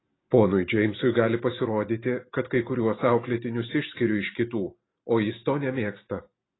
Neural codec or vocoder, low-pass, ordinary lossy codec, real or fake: none; 7.2 kHz; AAC, 16 kbps; real